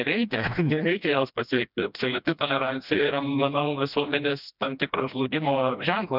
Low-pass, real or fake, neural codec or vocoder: 5.4 kHz; fake; codec, 16 kHz, 1 kbps, FreqCodec, smaller model